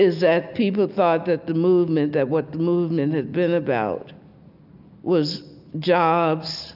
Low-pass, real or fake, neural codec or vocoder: 5.4 kHz; real; none